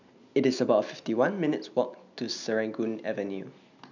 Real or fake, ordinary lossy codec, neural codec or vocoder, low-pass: real; none; none; 7.2 kHz